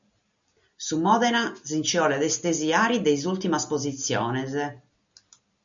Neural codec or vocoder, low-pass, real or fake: none; 7.2 kHz; real